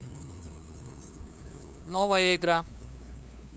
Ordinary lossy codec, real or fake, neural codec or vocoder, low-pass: none; fake; codec, 16 kHz, 2 kbps, FunCodec, trained on LibriTTS, 25 frames a second; none